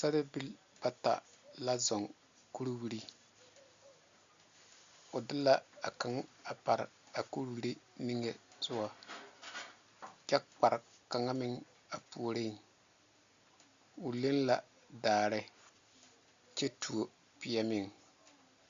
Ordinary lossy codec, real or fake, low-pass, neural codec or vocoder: Opus, 64 kbps; real; 7.2 kHz; none